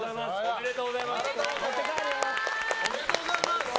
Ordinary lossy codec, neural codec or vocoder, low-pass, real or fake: none; none; none; real